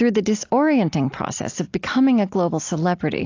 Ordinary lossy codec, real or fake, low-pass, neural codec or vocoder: AAC, 48 kbps; real; 7.2 kHz; none